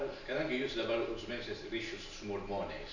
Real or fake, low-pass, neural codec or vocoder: real; 7.2 kHz; none